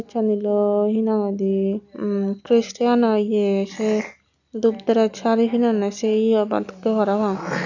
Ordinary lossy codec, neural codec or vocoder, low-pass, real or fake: none; none; 7.2 kHz; real